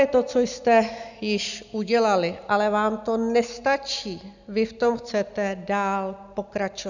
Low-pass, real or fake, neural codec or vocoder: 7.2 kHz; real; none